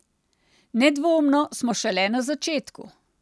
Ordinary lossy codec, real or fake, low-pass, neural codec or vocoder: none; real; none; none